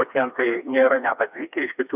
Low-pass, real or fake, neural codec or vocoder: 3.6 kHz; fake; codec, 16 kHz, 2 kbps, FreqCodec, smaller model